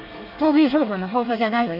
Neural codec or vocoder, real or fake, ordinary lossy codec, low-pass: codec, 24 kHz, 1 kbps, SNAC; fake; none; 5.4 kHz